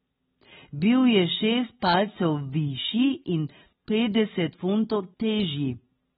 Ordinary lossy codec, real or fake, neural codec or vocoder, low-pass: AAC, 16 kbps; real; none; 19.8 kHz